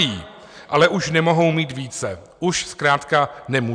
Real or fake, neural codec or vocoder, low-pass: real; none; 9.9 kHz